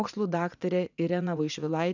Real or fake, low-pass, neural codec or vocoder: fake; 7.2 kHz; vocoder, 44.1 kHz, 80 mel bands, Vocos